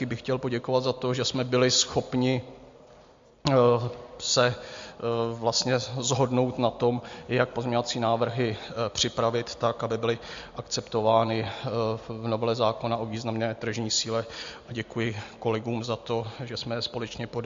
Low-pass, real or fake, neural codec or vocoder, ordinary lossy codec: 7.2 kHz; real; none; MP3, 48 kbps